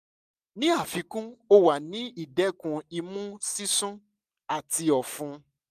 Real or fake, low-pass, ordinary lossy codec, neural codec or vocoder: real; 14.4 kHz; none; none